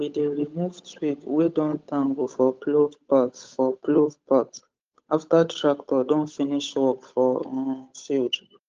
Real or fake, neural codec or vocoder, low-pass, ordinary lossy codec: fake; codec, 16 kHz, 8 kbps, FunCodec, trained on Chinese and English, 25 frames a second; 7.2 kHz; Opus, 24 kbps